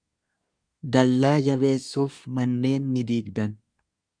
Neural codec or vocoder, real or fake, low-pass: codec, 24 kHz, 1 kbps, SNAC; fake; 9.9 kHz